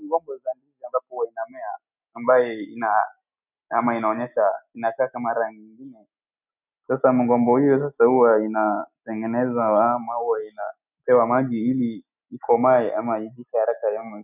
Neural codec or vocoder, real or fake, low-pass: none; real; 3.6 kHz